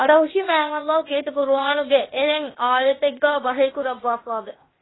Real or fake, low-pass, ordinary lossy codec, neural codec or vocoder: fake; 7.2 kHz; AAC, 16 kbps; codec, 16 kHz, 0.8 kbps, ZipCodec